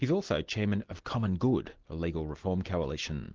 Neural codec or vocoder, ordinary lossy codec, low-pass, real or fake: none; Opus, 16 kbps; 7.2 kHz; real